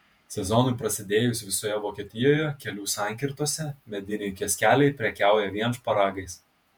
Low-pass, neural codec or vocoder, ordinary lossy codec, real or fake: 19.8 kHz; vocoder, 48 kHz, 128 mel bands, Vocos; MP3, 96 kbps; fake